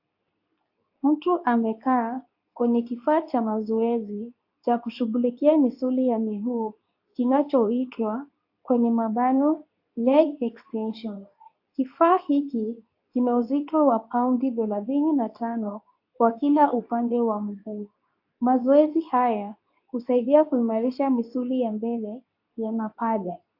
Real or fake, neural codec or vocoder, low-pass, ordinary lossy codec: fake; codec, 24 kHz, 0.9 kbps, WavTokenizer, medium speech release version 2; 5.4 kHz; AAC, 48 kbps